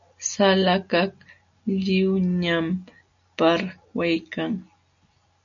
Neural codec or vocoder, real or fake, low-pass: none; real; 7.2 kHz